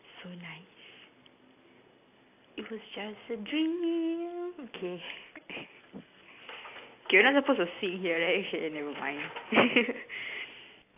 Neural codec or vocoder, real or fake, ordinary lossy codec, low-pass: none; real; AAC, 24 kbps; 3.6 kHz